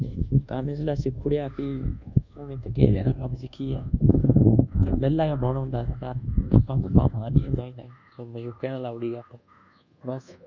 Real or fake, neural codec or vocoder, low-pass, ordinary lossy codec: fake; codec, 24 kHz, 1.2 kbps, DualCodec; 7.2 kHz; none